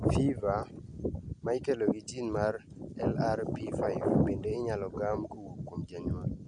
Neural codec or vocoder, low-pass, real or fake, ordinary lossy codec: none; 9.9 kHz; real; Opus, 64 kbps